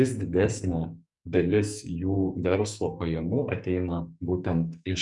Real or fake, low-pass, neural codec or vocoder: fake; 10.8 kHz; codec, 44.1 kHz, 2.6 kbps, SNAC